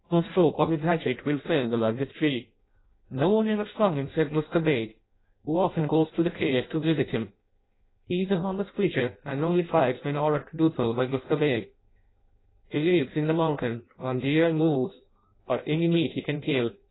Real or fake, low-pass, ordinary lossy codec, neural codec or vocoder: fake; 7.2 kHz; AAC, 16 kbps; codec, 16 kHz in and 24 kHz out, 0.6 kbps, FireRedTTS-2 codec